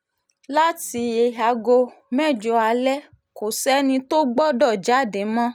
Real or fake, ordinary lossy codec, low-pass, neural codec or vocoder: real; none; none; none